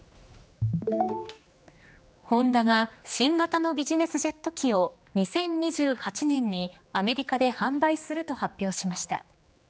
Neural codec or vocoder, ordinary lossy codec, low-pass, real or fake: codec, 16 kHz, 2 kbps, X-Codec, HuBERT features, trained on general audio; none; none; fake